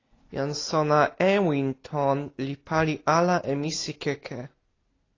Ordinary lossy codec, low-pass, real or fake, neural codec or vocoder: AAC, 32 kbps; 7.2 kHz; real; none